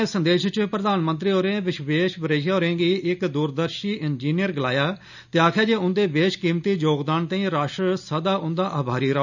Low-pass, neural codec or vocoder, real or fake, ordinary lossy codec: 7.2 kHz; none; real; none